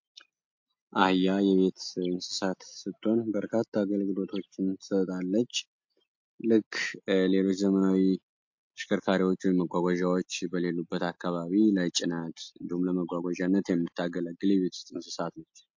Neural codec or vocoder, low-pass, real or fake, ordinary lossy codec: none; 7.2 kHz; real; MP3, 48 kbps